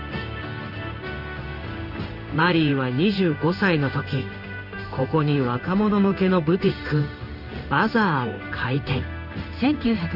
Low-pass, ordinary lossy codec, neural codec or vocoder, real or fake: 5.4 kHz; none; codec, 16 kHz in and 24 kHz out, 1 kbps, XY-Tokenizer; fake